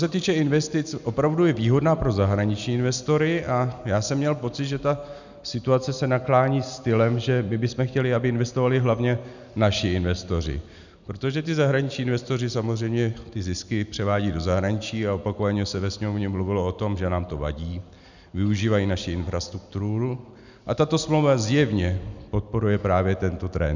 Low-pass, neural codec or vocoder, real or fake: 7.2 kHz; none; real